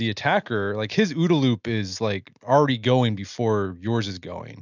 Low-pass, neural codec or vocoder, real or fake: 7.2 kHz; none; real